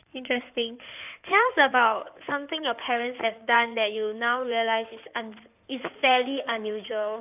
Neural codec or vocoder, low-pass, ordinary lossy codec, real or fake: codec, 16 kHz in and 24 kHz out, 2.2 kbps, FireRedTTS-2 codec; 3.6 kHz; none; fake